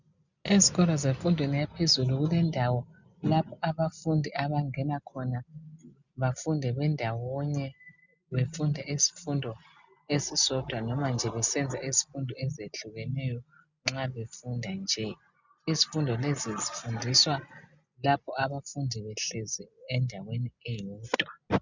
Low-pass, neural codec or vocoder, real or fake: 7.2 kHz; none; real